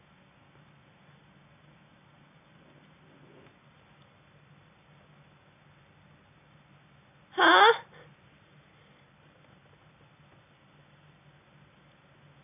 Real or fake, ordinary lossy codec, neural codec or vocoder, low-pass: real; none; none; 3.6 kHz